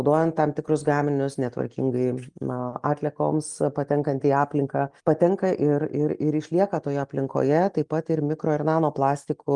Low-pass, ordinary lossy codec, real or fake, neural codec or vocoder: 10.8 kHz; Opus, 32 kbps; real; none